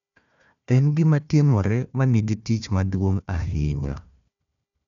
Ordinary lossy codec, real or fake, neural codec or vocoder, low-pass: none; fake; codec, 16 kHz, 1 kbps, FunCodec, trained on Chinese and English, 50 frames a second; 7.2 kHz